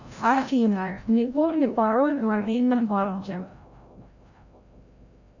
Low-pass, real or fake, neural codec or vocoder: 7.2 kHz; fake; codec, 16 kHz, 0.5 kbps, FreqCodec, larger model